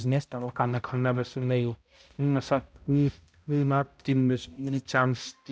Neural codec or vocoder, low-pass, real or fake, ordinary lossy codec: codec, 16 kHz, 0.5 kbps, X-Codec, HuBERT features, trained on balanced general audio; none; fake; none